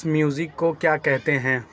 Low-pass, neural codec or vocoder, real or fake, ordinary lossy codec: none; none; real; none